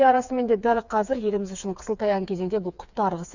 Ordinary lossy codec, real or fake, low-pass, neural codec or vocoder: none; fake; 7.2 kHz; codec, 16 kHz, 4 kbps, FreqCodec, smaller model